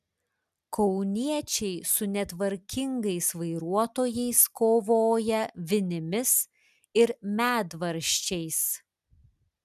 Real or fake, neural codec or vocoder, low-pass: real; none; 14.4 kHz